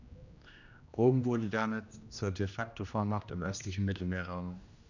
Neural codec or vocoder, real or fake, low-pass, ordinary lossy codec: codec, 16 kHz, 1 kbps, X-Codec, HuBERT features, trained on general audio; fake; 7.2 kHz; none